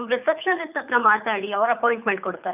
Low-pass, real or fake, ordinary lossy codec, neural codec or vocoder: 3.6 kHz; fake; none; codec, 24 kHz, 6 kbps, HILCodec